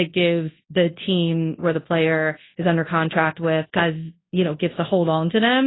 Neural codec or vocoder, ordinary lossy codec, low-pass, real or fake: codec, 24 kHz, 0.9 kbps, WavTokenizer, large speech release; AAC, 16 kbps; 7.2 kHz; fake